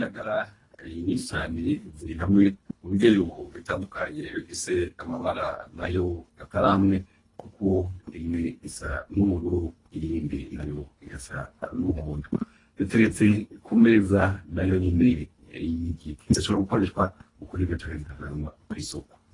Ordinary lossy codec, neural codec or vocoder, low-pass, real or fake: AAC, 32 kbps; codec, 24 kHz, 1.5 kbps, HILCodec; 10.8 kHz; fake